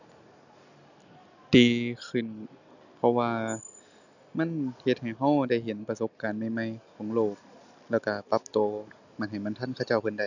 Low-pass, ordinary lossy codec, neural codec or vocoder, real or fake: 7.2 kHz; none; none; real